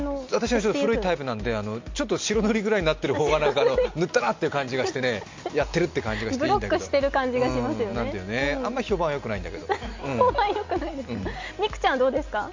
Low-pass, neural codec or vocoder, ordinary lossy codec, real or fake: 7.2 kHz; none; MP3, 48 kbps; real